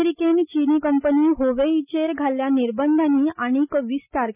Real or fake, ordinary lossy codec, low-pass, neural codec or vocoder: real; none; 3.6 kHz; none